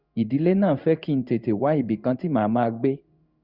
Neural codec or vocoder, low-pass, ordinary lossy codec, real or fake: codec, 16 kHz in and 24 kHz out, 1 kbps, XY-Tokenizer; 5.4 kHz; Opus, 64 kbps; fake